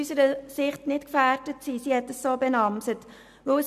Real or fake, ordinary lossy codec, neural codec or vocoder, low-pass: real; none; none; 14.4 kHz